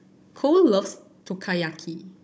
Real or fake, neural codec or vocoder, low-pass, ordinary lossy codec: fake; codec, 16 kHz, 16 kbps, FunCodec, trained on Chinese and English, 50 frames a second; none; none